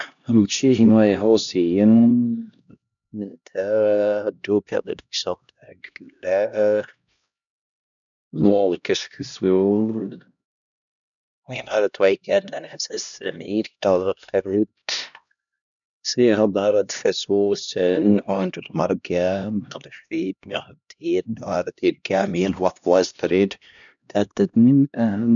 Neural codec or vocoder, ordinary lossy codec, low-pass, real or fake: codec, 16 kHz, 1 kbps, X-Codec, HuBERT features, trained on LibriSpeech; none; 7.2 kHz; fake